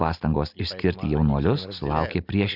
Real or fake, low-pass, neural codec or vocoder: real; 5.4 kHz; none